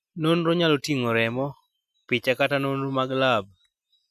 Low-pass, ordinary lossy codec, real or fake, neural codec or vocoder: 14.4 kHz; none; real; none